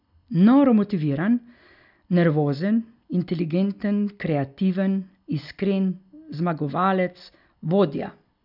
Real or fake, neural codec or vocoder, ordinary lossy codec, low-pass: real; none; none; 5.4 kHz